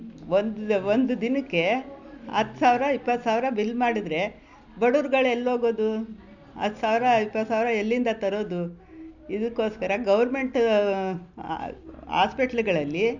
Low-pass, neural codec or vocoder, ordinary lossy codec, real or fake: 7.2 kHz; none; none; real